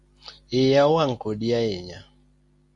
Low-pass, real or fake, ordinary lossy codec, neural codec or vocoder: 10.8 kHz; real; MP3, 48 kbps; none